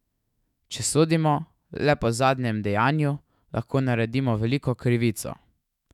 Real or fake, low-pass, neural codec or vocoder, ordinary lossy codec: fake; 19.8 kHz; autoencoder, 48 kHz, 128 numbers a frame, DAC-VAE, trained on Japanese speech; none